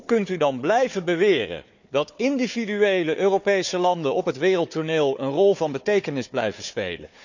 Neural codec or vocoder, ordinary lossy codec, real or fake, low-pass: codec, 16 kHz, 4 kbps, FunCodec, trained on Chinese and English, 50 frames a second; none; fake; 7.2 kHz